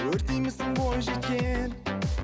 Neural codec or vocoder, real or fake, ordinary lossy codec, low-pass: none; real; none; none